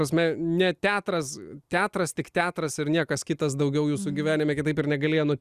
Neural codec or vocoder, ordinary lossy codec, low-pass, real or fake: none; Opus, 64 kbps; 14.4 kHz; real